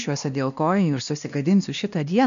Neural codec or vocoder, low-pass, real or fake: codec, 16 kHz, 1 kbps, X-Codec, WavLM features, trained on Multilingual LibriSpeech; 7.2 kHz; fake